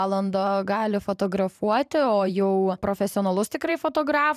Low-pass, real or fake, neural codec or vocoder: 14.4 kHz; fake; vocoder, 44.1 kHz, 128 mel bands every 512 samples, BigVGAN v2